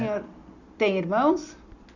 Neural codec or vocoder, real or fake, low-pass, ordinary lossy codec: none; real; 7.2 kHz; none